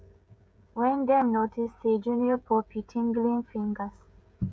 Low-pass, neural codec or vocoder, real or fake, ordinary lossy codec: none; codec, 16 kHz, 16 kbps, FreqCodec, smaller model; fake; none